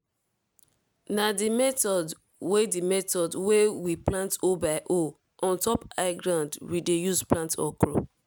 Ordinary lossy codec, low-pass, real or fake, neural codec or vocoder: none; none; real; none